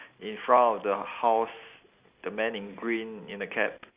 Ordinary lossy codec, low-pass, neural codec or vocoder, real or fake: Opus, 64 kbps; 3.6 kHz; none; real